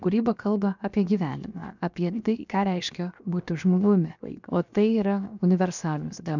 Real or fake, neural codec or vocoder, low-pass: fake; codec, 16 kHz, 0.7 kbps, FocalCodec; 7.2 kHz